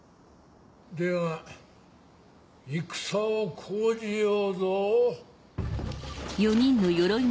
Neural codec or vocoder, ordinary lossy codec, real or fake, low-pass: none; none; real; none